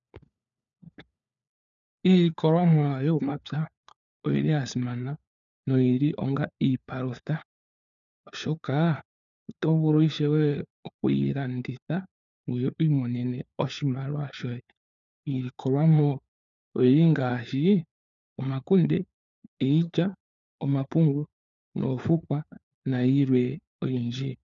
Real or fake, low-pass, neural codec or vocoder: fake; 7.2 kHz; codec, 16 kHz, 4 kbps, FunCodec, trained on LibriTTS, 50 frames a second